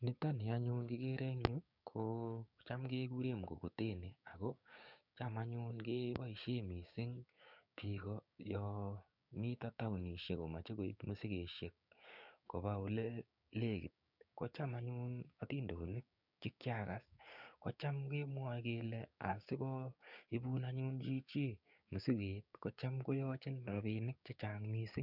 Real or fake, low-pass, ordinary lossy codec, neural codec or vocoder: fake; 5.4 kHz; none; codec, 16 kHz, 6 kbps, DAC